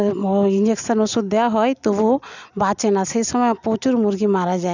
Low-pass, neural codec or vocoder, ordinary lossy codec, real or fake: 7.2 kHz; none; none; real